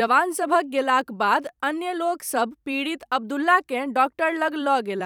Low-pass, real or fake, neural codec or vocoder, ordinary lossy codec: 19.8 kHz; fake; vocoder, 44.1 kHz, 128 mel bands every 256 samples, BigVGAN v2; none